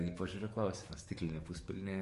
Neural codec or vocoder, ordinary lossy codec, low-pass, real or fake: codec, 44.1 kHz, 7.8 kbps, DAC; MP3, 48 kbps; 14.4 kHz; fake